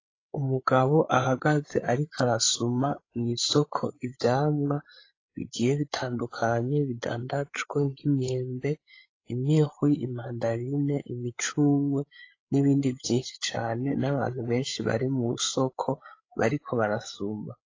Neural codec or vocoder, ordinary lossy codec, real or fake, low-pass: codec, 16 kHz, 8 kbps, FreqCodec, larger model; AAC, 32 kbps; fake; 7.2 kHz